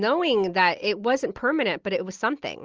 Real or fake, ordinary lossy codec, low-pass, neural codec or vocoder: real; Opus, 24 kbps; 7.2 kHz; none